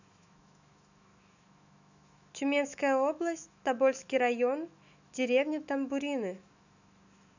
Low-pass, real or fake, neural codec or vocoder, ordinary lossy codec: 7.2 kHz; fake; autoencoder, 48 kHz, 128 numbers a frame, DAC-VAE, trained on Japanese speech; none